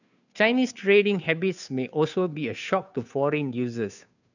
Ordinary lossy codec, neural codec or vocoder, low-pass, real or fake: none; codec, 16 kHz, 2 kbps, FunCodec, trained on Chinese and English, 25 frames a second; 7.2 kHz; fake